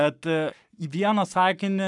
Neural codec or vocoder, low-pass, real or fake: codec, 44.1 kHz, 7.8 kbps, Pupu-Codec; 10.8 kHz; fake